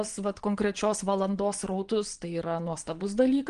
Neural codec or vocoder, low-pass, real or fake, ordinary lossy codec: vocoder, 22.05 kHz, 80 mel bands, Vocos; 9.9 kHz; fake; Opus, 24 kbps